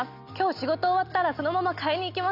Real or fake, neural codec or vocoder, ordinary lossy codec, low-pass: real; none; none; 5.4 kHz